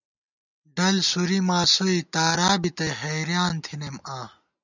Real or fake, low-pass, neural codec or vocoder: real; 7.2 kHz; none